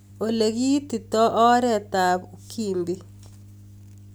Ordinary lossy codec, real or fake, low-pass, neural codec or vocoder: none; real; none; none